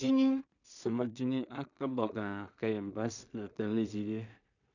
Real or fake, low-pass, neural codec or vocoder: fake; 7.2 kHz; codec, 16 kHz in and 24 kHz out, 0.4 kbps, LongCat-Audio-Codec, two codebook decoder